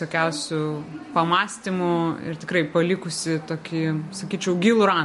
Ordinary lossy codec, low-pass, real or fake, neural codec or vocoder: MP3, 48 kbps; 14.4 kHz; real; none